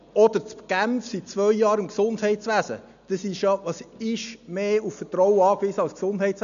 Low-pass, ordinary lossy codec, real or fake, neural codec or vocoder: 7.2 kHz; none; real; none